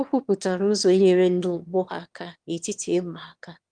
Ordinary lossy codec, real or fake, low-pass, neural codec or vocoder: Opus, 24 kbps; fake; 9.9 kHz; autoencoder, 22.05 kHz, a latent of 192 numbers a frame, VITS, trained on one speaker